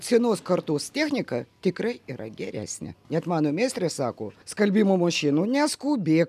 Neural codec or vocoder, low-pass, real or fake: none; 14.4 kHz; real